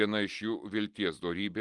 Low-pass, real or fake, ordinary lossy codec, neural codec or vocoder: 10.8 kHz; real; Opus, 24 kbps; none